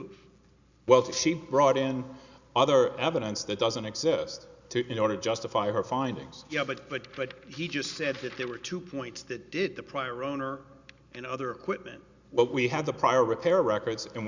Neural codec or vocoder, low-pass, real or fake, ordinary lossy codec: none; 7.2 kHz; real; Opus, 64 kbps